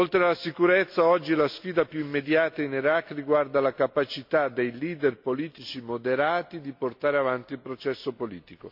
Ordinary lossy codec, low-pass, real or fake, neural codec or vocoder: MP3, 48 kbps; 5.4 kHz; real; none